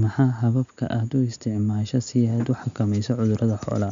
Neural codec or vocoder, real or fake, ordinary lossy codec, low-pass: none; real; MP3, 64 kbps; 7.2 kHz